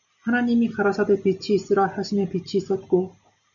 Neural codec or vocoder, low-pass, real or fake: none; 7.2 kHz; real